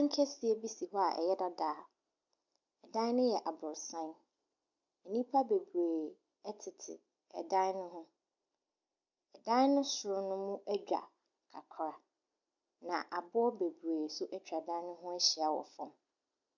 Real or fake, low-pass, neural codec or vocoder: real; 7.2 kHz; none